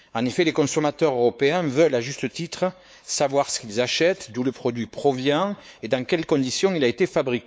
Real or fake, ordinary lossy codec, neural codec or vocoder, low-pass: fake; none; codec, 16 kHz, 4 kbps, X-Codec, WavLM features, trained on Multilingual LibriSpeech; none